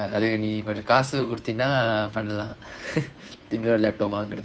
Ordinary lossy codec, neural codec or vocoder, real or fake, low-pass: none; codec, 16 kHz, 2 kbps, FunCodec, trained on Chinese and English, 25 frames a second; fake; none